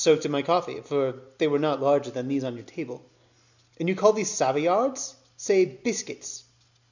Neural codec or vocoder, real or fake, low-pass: none; real; 7.2 kHz